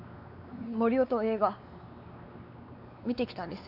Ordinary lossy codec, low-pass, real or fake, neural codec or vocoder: none; 5.4 kHz; fake; codec, 16 kHz, 4 kbps, X-Codec, WavLM features, trained on Multilingual LibriSpeech